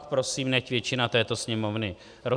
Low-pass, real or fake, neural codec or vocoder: 9.9 kHz; real; none